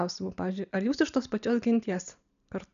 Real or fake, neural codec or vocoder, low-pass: real; none; 7.2 kHz